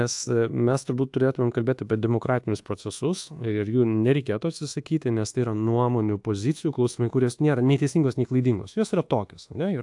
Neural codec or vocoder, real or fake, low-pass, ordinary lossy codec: codec, 24 kHz, 1.2 kbps, DualCodec; fake; 10.8 kHz; AAC, 64 kbps